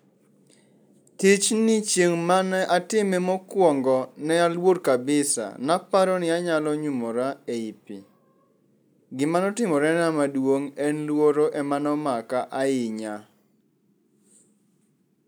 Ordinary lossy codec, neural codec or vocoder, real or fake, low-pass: none; none; real; none